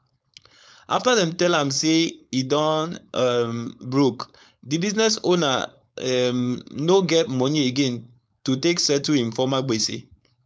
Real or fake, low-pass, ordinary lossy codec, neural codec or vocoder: fake; none; none; codec, 16 kHz, 4.8 kbps, FACodec